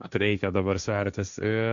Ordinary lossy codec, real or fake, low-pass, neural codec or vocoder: MP3, 96 kbps; fake; 7.2 kHz; codec, 16 kHz, 1.1 kbps, Voila-Tokenizer